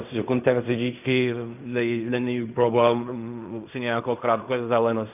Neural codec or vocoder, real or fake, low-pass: codec, 16 kHz in and 24 kHz out, 0.4 kbps, LongCat-Audio-Codec, fine tuned four codebook decoder; fake; 3.6 kHz